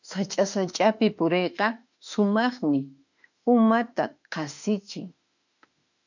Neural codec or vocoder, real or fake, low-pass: autoencoder, 48 kHz, 32 numbers a frame, DAC-VAE, trained on Japanese speech; fake; 7.2 kHz